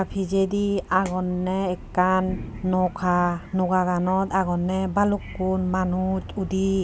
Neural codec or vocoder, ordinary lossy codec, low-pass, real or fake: none; none; none; real